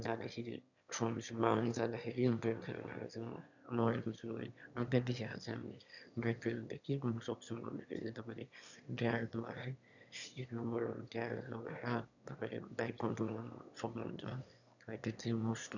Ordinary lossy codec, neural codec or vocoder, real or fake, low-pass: none; autoencoder, 22.05 kHz, a latent of 192 numbers a frame, VITS, trained on one speaker; fake; 7.2 kHz